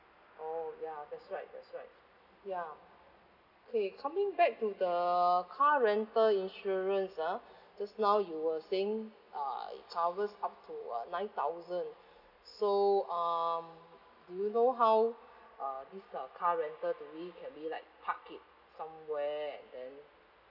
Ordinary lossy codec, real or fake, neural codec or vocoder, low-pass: none; real; none; 5.4 kHz